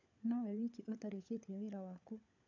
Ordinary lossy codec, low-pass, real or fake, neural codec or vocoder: none; 7.2 kHz; fake; codec, 16 kHz, 16 kbps, FunCodec, trained on LibriTTS, 50 frames a second